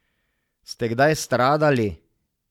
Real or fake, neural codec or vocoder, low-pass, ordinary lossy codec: real; none; 19.8 kHz; none